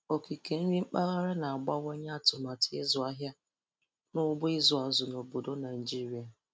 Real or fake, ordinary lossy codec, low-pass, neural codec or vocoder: real; none; none; none